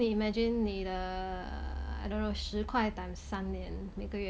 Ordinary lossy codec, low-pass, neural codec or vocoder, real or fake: none; none; none; real